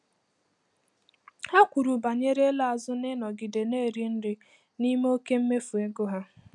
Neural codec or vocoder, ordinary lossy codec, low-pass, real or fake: none; none; 10.8 kHz; real